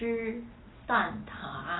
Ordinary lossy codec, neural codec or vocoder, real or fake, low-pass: AAC, 16 kbps; none; real; 7.2 kHz